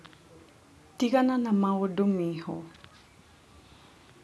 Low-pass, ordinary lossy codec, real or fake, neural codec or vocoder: none; none; real; none